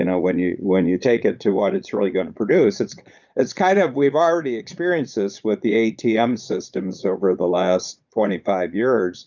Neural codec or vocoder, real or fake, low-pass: vocoder, 22.05 kHz, 80 mel bands, Vocos; fake; 7.2 kHz